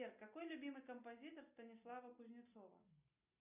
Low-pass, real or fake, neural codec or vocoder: 3.6 kHz; real; none